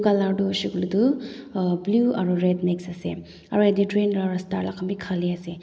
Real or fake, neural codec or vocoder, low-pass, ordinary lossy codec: real; none; none; none